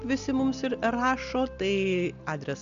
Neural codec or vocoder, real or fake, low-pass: none; real; 7.2 kHz